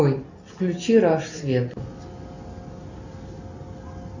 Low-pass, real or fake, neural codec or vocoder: 7.2 kHz; real; none